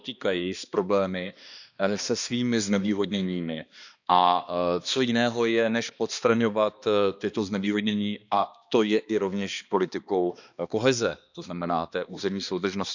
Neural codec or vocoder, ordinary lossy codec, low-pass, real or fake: codec, 16 kHz, 2 kbps, X-Codec, HuBERT features, trained on balanced general audio; none; 7.2 kHz; fake